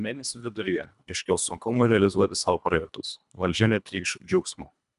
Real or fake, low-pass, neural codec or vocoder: fake; 10.8 kHz; codec, 24 kHz, 1.5 kbps, HILCodec